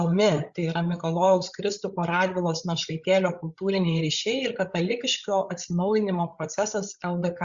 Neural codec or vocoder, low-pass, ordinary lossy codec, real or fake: codec, 16 kHz, 8 kbps, FreqCodec, larger model; 7.2 kHz; Opus, 64 kbps; fake